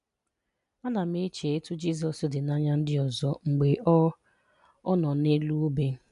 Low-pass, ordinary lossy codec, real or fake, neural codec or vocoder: 10.8 kHz; none; real; none